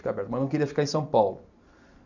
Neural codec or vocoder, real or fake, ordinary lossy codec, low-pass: none; real; none; 7.2 kHz